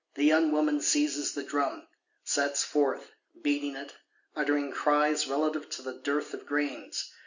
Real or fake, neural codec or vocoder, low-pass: real; none; 7.2 kHz